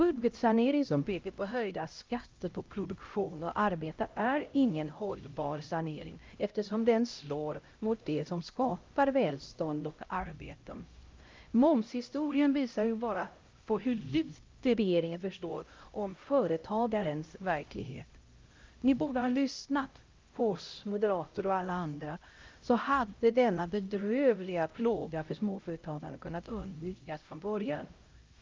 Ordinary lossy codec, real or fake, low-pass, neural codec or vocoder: Opus, 24 kbps; fake; 7.2 kHz; codec, 16 kHz, 0.5 kbps, X-Codec, HuBERT features, trained on LibriSpeech